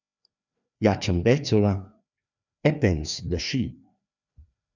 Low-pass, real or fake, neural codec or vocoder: 7.2 kHz; fake; codec, 16 kHz, 2 kbps, FreqCodec, larger model